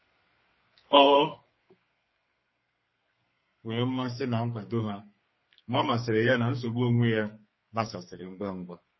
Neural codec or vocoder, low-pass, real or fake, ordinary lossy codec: codec, 32 kHz, 1.9 kbps, SNAC; 7.2 kHz; fake; MP3, 24 kbps